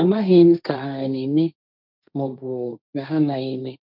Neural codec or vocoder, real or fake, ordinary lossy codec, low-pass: codec, 16 kHz, 1.1 kbps, Voila-Tokenizer; fake; none; 5.4 kHz